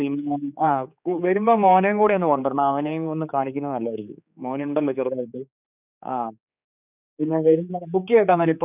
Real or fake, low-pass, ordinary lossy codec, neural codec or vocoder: fake; 3.6 kHz; none; codec, 16 kHz, 4 kbps, X-Codec, HuBERT features, trained on general audio